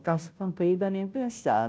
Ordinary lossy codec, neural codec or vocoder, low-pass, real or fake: none; codec, 16 kHz, 0.5 kbps, FunCodec, trained on Chinese and English, 25 frames a second; none; fake